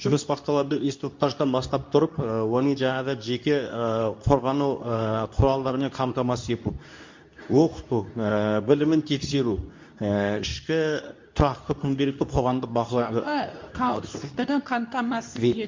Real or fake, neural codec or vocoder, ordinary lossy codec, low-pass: fake; codec, 24 kHz, 0.9 kbps, WavTokenizer, medium speech release version 2; MP3, 48 kbps; 7.2 kHz